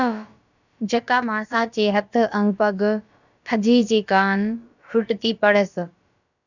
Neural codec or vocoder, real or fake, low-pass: codec, 16 kHz, about 1 kbps, DyCAST, with the encoder's durations; fake; 7.2 kHz